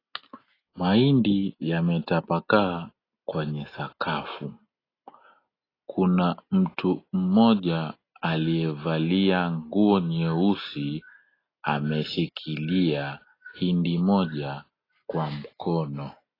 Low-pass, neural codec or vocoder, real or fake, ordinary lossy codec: 5.4 kHz; none; real; AAC, 24 kbps